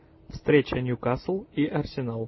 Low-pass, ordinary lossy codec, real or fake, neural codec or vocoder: 7.2 kHz; MP3, 24 kbps; real; none